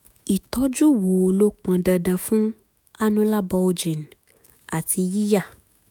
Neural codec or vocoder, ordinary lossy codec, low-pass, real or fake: autoencoder, 48 kHz, 128 numbers a frame, DAC-VAE, trained on Japanese speech; none; none; fake